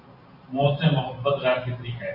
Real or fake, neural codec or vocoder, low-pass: real; none; 5.4 kHz